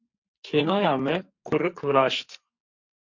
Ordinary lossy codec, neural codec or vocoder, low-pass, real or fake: MP3, 48 kbps; codec, 44.1 kHz, 2.6 kbps, SNAC; 7.2 kHz; fake